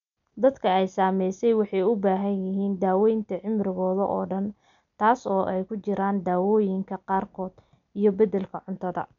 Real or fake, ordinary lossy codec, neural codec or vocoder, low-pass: real; none; none; 7.2 kHz